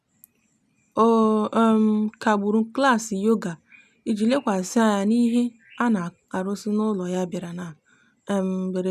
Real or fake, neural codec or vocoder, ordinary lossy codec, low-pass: real; none; none; 14.4 kHz